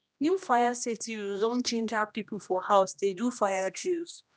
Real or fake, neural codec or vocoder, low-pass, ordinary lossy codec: fake; codec, 16 kHz, 1 kbps, X-Codec, HuBERT features, trained on general audio; none; none